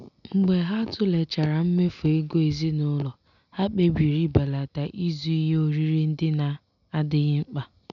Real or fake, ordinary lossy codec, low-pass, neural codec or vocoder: real; none; 7.2 kHz; none